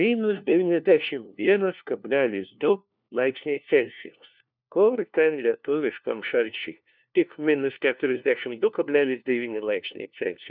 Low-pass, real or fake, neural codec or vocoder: 5.4 kHz; fake; codec, 16 kHz, 1 kbps, FunCodec, trained on LibriTTS, 50 frames a second